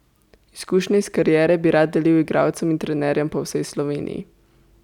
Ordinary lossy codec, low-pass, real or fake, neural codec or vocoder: none; 19.8 kHz; real; none